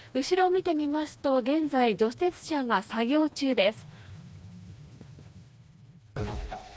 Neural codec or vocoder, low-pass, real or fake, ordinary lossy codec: codec, 16 kHz, 2 kbps, FreqCodec, smaller model; none; fake; none